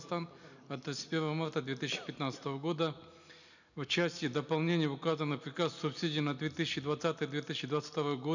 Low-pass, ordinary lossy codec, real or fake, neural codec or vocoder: 7.2 kHz; none; real; none